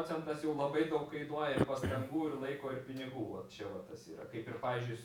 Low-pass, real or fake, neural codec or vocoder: 19.8 kHz; fake; vocoder, 48 kHz, 128 mel bands, Vocos